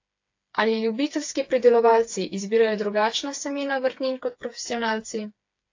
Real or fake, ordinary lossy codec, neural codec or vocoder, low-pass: fake; AAC, 48 kbps; codec, 16 kHz, 4 kbps, FreqCodec, smaller model; 7.2 kHz